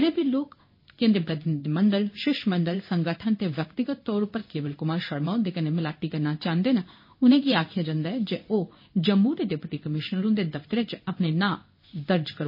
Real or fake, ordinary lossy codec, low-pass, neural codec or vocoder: fake; MP3, 24 kbps; 5.4 kHz; codec, 16 kHz in and 24 kHz out, 1 kbps, XY-Tokenizer